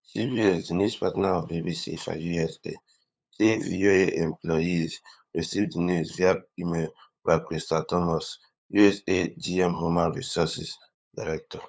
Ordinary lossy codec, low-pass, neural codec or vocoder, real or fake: none; none; codec, 16 kHz, 8 kbps, FunCodec, trained on LibriTTS, 25 frames a second; fake